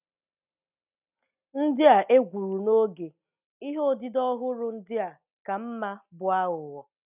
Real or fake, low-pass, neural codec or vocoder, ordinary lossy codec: real; 3.6 kHz; none; none